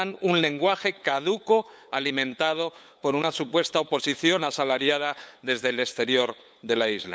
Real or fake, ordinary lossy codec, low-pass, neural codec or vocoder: fake; none; none; codec, 16 kHz, 8 kbps, FunCodec, trained on LibriTTS, 25 frames a second